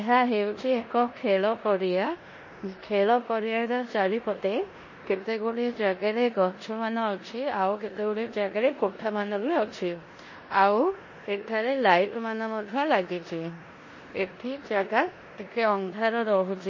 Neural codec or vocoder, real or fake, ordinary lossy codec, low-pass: codec, 16 kHz in and 24 kHz out, 0.9 kbps, LongCat-Audio-Codec, four codebook decoder; fake; MP3, 32 kbps; 7.2 kHz